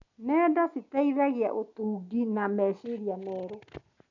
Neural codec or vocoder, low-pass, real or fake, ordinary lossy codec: none; 7.2 kHz; real; none